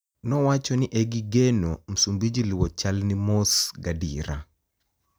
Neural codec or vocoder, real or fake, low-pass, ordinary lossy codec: none; real; none; none